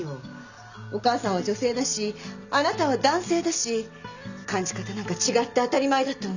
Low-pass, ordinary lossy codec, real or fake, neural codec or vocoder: 7.2 kHz; none; real; none